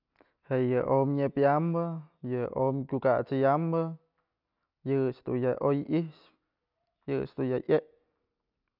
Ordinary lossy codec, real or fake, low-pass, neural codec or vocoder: none; real; 5.4 kHz; none